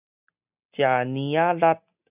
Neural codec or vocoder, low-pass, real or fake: none; 3.6 kHz; real